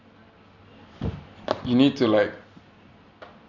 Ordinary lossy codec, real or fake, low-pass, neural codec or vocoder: none; real; 7.2 kHz; none